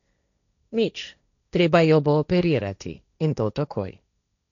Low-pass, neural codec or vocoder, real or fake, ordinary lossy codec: 7.2 kHz; codec, 16 kHz, 1.1 kbps, Voila-Tokenizer; fake; none